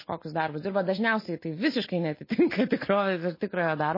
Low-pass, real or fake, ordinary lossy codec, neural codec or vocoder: 5.4 kHz; real; MP3, 24 kbps; none